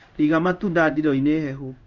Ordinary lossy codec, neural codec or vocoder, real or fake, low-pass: Opus, 64 kbps; codec, 16 kHz in and 24 kHz out, 1 kbps, XY-Tokenizer; fake; 7.2 kHz